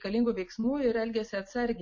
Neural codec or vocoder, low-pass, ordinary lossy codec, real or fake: none; 7.2 kHz; MP3, 32 kbps; real